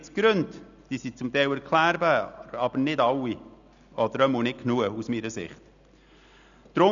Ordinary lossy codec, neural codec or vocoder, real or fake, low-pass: none; none; real; 7.2 kHz